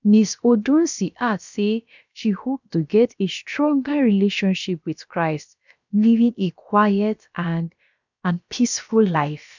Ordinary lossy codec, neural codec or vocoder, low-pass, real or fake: none; codec, 16 kHz, about 1 kbps, DyCAST, with the encoder's durations; 7.2 kHz; fake